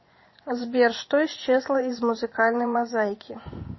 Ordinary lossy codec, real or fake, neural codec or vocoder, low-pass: MP3, 24 kbps; fake; vocoder, 24 kHz, 100 mel bands, Vocos; 7.2 kHz